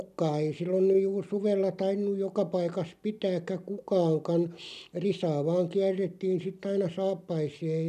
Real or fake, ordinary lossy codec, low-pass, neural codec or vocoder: real; none; 14.4 kHz; none